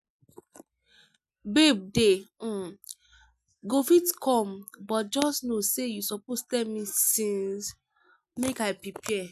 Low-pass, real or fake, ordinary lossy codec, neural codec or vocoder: 14.4 kHz; real; none; none